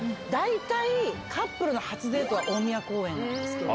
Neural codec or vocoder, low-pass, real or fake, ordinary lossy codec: none; none; real; none